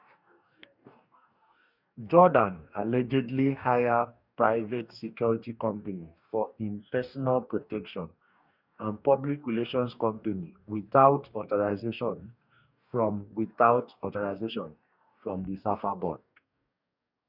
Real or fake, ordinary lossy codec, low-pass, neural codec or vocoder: fake; none; 5.4 kHz; codec, 44.1 kHz, 2.6 kbps, DAC